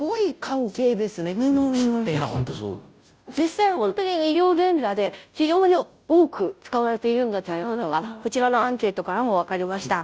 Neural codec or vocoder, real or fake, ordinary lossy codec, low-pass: codec, 16 kHz, 0.5 kbps, FunCodec, trained on Chinese and English, 25 frames a second; fake; none; none